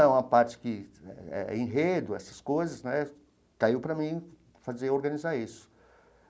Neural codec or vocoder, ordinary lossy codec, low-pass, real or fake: none; none; none; real